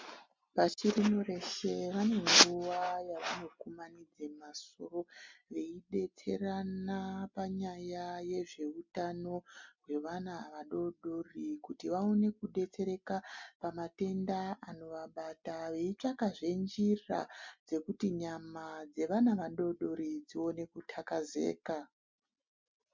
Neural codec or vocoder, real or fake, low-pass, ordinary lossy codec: none; real; 7.2 kHz; MP3, 64 kbps